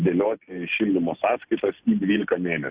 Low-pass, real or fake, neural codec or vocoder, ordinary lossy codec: 3.6 kHz; real; none; Opus, 64 kbps